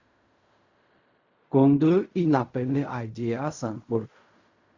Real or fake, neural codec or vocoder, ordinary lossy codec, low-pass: fake; codec, 16 kHz in and 24 kHz out, 0.4 kbps, LongCat-Audio-Codec, fine tuned four codebook decoder; Opus, 64 kbps; 7.2 kHz